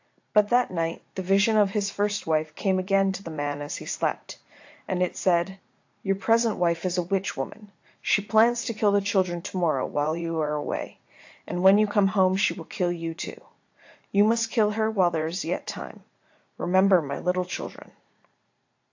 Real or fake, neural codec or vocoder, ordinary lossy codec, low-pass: fake; vocoder, 44.1 kHz, 80 mel bands, Vocos; AAC, 48 kbps; 7.2 kHz